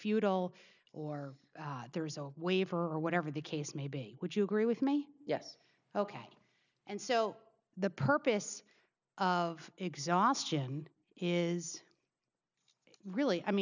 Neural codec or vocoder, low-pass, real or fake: none; 7.2 kHz; real